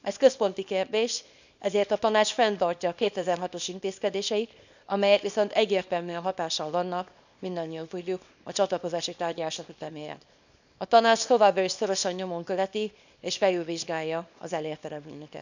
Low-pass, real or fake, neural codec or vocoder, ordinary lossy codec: 7.2 kHz; fake; codec, 24 kHz, 0.9 kbps, WavTokenizer, small release; none